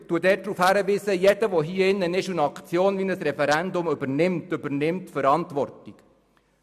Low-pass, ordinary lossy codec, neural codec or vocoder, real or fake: 14.4 kHz; MP3, 96 kbps; none; real